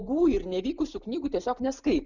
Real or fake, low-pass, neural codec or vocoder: real; 7.2 kHz; none